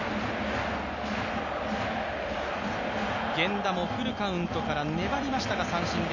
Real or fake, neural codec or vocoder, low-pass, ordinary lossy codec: real; none; 7.2 kHz; none